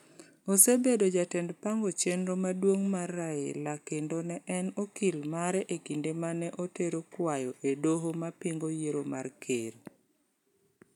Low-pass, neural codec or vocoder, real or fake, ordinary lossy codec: 19.8 kHz; none; real; none